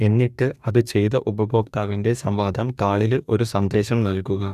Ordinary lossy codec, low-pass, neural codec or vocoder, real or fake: none; 14.4 kHz; codec, 44.1 kHz, 2.6 kbps, DAC; fake